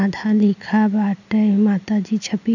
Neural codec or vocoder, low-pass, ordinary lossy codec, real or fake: none; 7.2 kHz; none; real